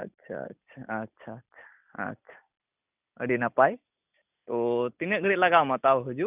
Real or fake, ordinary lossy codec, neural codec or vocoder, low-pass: real; none; none; 3.6 kHz